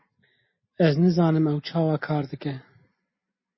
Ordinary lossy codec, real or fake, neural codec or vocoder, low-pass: MP3, 24 kbps; real; none; 7.2 kHz